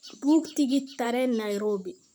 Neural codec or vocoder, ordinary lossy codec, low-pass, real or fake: vocoder, 44.1 kHz, 128 mel bands, Pupu-Vocoder; none; none; fake